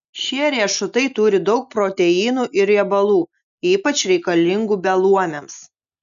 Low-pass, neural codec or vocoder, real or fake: 7.2 kHz; none; real